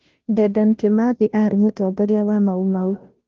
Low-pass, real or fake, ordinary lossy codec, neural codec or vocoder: 7.2 kHz; fake; Opus, 16 kbps; codec, 16 kHz, 0.5 kbps, FunCodec, trained on Chinese and English, 25 frames a second